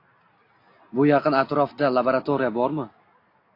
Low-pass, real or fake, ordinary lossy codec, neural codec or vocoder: 5.4 kHz; real; AAC, 32 kbps; none